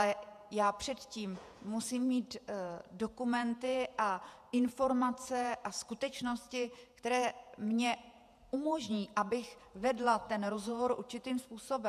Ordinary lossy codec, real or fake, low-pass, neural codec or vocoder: MP3, 96 kbps; fake; 14.4 kHz; vocoder, 48 kHz, 128 mel bands, Vocos